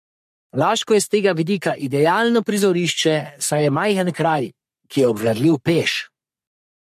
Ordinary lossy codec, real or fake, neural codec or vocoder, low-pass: MP3, 64 kbps; fake; codec, 44.1 kHz, 3.4 kbps, Pupu-Codec; 14.4 kHz